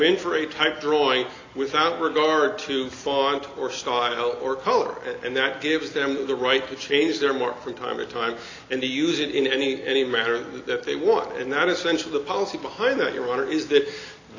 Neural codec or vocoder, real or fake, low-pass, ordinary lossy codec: none; real; 7.2 kHz; AAC, 32 kbps